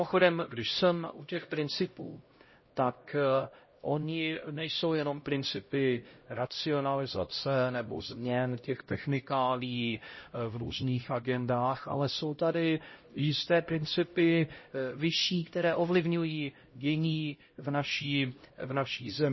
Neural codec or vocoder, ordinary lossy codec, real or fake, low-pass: codec, 16 kHz, 0.5 kbps, X-Codec, HuBERT features, trained on LibriSpeech; MP3, 24 kbps; fake; 7.2 kHz